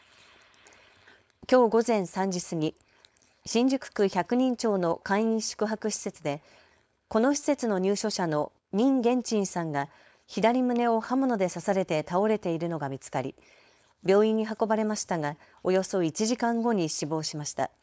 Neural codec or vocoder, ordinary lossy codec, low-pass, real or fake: codec, 16 kHz, 4.8 kbps, FACodec; none; none; fake